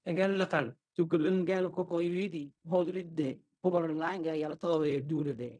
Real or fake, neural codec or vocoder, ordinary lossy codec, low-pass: fake; codec, 16 kHz in and 24 kHz out, 0.4 kbps, LongCat-Audio-Codec, fine tuned four codebook decoder; none; 9.9 kHz